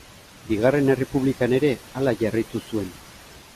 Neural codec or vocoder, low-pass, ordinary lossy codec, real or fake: none; 14.4 kHz; MP3, 96 kbps; real